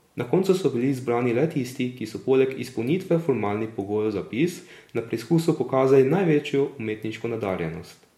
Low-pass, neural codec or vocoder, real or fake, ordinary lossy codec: 19.8 kHz; none; real; MP3, 64 kbps